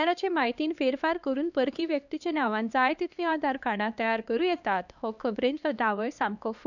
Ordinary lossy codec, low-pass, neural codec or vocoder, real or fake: none; 7.2 kHz; codec, 16 kHz, 2 kbps, X-Codec, HuBERT features, trained on LibriSpeech; fake